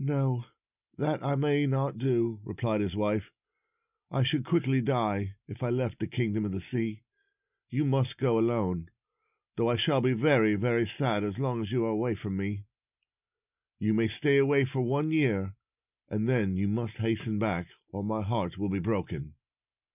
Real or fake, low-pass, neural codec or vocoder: real; 3.6 kHz; none